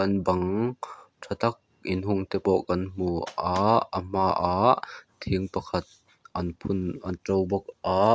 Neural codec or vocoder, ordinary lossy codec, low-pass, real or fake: none; none; none; real